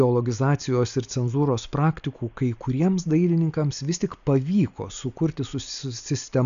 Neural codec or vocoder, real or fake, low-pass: none; real; 7.2 kHz